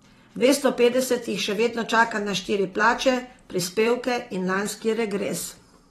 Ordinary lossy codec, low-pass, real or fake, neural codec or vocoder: AAC, 32 kbps; 19.8 kHz; fake; vocoder, 44.1 kHz, 128 mel bands every 512 samples, BigVGAN v2